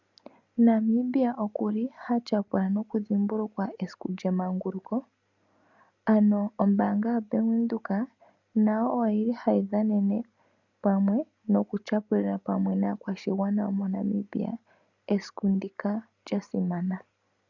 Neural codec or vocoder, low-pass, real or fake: none; 7.2 kHz; real